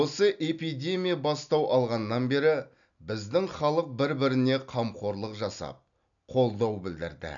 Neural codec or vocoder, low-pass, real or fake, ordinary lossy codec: none; 7.2 kHz; real; none